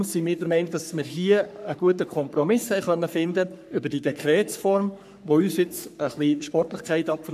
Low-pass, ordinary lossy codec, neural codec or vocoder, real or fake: 14.4 kHz; none; codec, 44.1 kHz, 3.4 kbps, Pupu-Codec; fake